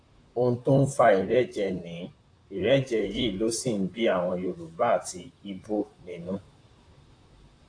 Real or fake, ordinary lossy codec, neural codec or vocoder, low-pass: fake; AAC, 48 kbps; vocoder, 44.1 kHz, 128 mel bands, Pupu-Vocoder; 9.9 kHz